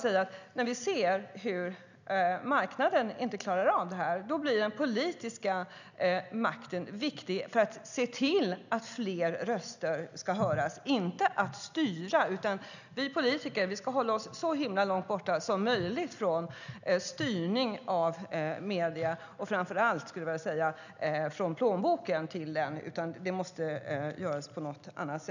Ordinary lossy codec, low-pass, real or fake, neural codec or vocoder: none; 7.2 kHz; real; none